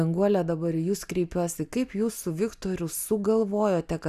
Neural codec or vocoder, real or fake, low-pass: none; real; 14.4 kHz